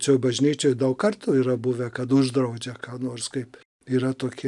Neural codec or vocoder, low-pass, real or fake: none; 10.8 kHz; real